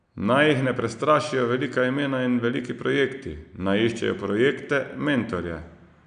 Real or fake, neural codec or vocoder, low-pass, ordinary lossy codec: real; none; 9.9 kHz; none